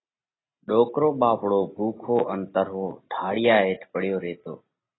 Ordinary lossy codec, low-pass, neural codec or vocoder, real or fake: AAC, 16 kbps; 7.2 kHz; none; real